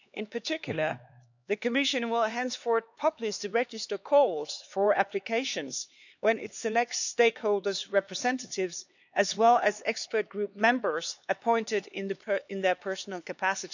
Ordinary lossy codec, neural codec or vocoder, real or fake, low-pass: none; codec, 16 kHz, 4 kbps, X-Codec, HuBERT features, trained on LibriSpeech; fake; 7.2 kHz